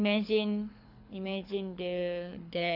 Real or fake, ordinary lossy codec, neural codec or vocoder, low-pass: fake; none; codec, 24 kHz, 6 kbps, HILCodec; 5.4 kHz